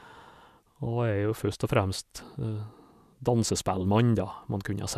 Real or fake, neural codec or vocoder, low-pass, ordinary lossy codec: real; none; 14.4 kHz; none